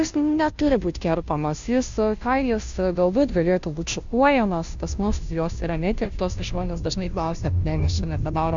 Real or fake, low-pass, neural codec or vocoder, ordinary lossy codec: fake; 7.2 kHz; codec, 16 kHz, 0.5 kbps, FunCodec, trained on Chinese and English, 25 frames a second; Opus, 64 kbps